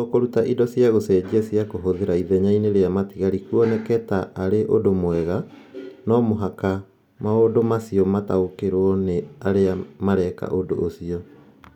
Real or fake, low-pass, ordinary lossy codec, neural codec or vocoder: real; 19.8 kHz; none; none